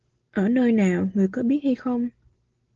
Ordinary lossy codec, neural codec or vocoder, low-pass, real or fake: Opus, 16 kbps; none; 7.2 kHz; real